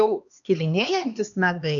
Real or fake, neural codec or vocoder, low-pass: fake; codec, 16 kHz, 2 kbps, X-Codec, HuBERT features, trained on LibriSpeech; 7.2 kHz